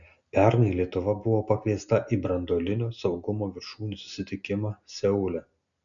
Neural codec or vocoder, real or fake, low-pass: none; real; 7.2 kHz